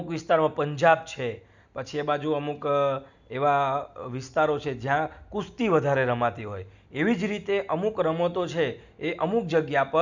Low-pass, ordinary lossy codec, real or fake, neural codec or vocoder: 7.2 kHz; none; real; none